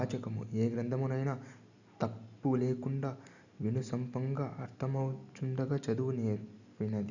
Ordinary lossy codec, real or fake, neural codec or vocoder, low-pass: none; real; none; 7.2 kHz